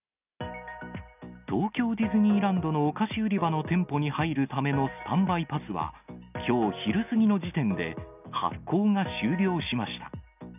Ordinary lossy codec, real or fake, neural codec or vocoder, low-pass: none; real; none; 3.6 kHz